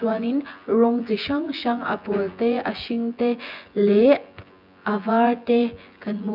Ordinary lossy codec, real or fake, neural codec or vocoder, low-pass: none; fake; vocoder, 24 kHz, 100 mel bands, Vocos; 5.4 kHz